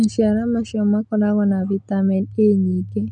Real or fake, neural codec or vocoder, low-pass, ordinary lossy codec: real; none; 10.8 kHz; none